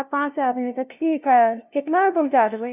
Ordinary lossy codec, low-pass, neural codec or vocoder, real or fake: none; 3.6 kHz; codec, 16 kHz, 0.5 kbps, FunCodec, trained on LibriTTS, 25 frames a second; fake